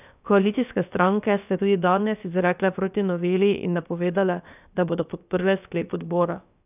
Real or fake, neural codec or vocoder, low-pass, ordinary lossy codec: fake; codec, 16 kHz, about 1 kbps, DyCAST, with the encoder's durations; 3.6 kHz; none